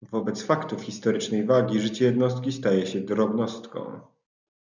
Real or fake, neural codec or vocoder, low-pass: real; none; 7.2 kHz